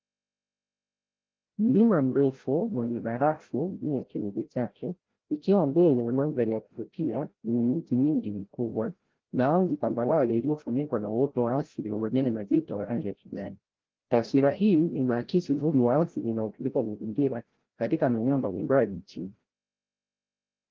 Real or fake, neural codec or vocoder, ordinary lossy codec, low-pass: fake; codec, 16 kHz, 0.5 kbps, FreqCodec, larger model; Opus, 16 kbps; 7.2 kHz